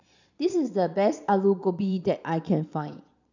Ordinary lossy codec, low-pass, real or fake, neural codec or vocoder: none; 7.2 kHz; fake; vocoder, 22.05 kHz, 80 mel bands, Vocos